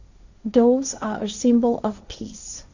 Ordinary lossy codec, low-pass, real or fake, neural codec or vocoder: none; 7.2 kHz; fake; codec, 16 kHz, 1.1 kbps, Voila-Tokenizer